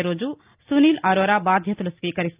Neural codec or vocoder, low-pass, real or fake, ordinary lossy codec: none; 3.6 kHz; real; Opus, 24 kbps